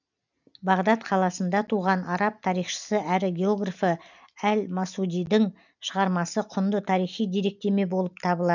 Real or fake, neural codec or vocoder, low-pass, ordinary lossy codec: real; none; 7.2 kHz; none